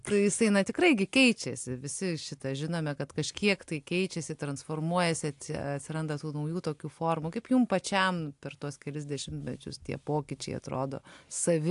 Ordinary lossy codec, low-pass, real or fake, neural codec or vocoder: AAC, 64 kbps; 10.8 kHz; real; none